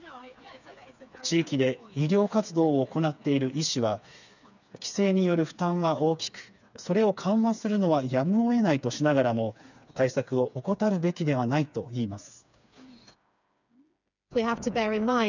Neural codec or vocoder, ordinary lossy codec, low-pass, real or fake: codec, 16 kHz, 4 kbps, FreqCodec, smaller model; none; 7.2 kHz; fake